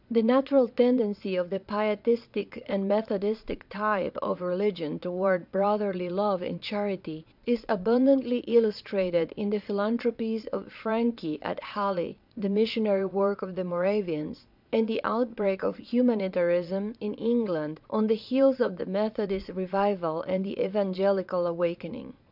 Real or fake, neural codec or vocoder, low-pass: fake; vocoder, 22.05 kHz, 80 mel bands, Vocos; 5.4 kHz